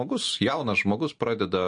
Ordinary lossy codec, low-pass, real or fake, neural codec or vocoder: MP3, 48 kbps; 9.9 kHz; real; none